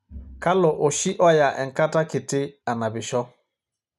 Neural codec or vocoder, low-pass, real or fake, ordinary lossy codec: none; 14.4 kHz; real; none